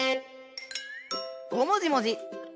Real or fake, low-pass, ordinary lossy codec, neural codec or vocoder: real; none; none; none